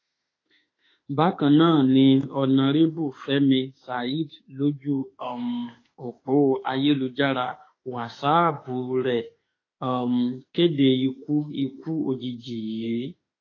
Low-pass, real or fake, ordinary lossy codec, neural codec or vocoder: 7.2 kHz; fake; AAC, 32 kbps; autoencoder, 48 kHz, 32 numbers a frame, DAC-VAE, trained on Japanese speech